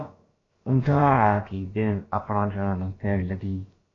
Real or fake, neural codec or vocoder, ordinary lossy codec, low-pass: fake; codec, 16 kHz, about 1 kbps, DyCAST, with the encoder's durations; AAC, 32 kbps; 7.2 kHz